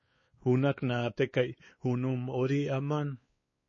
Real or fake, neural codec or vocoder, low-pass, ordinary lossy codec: fake; codec, 16 kHz, 4 kbps, X-Codec, WavLM features, trained on Multilingual LibriSpeech; 7.2 kHz; MP3, 32 kbps